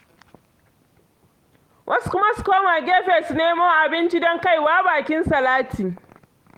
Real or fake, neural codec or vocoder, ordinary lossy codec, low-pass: real; none; Opus, 24 kbps; 19.8 kHz